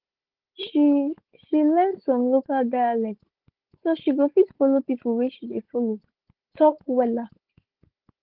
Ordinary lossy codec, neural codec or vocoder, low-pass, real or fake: Opus, 16 kbps; codec, 16 kHz, 16 kbps, FunCodec, trained on Chinese and English, 50 frames a second; 5.4 kHz; fake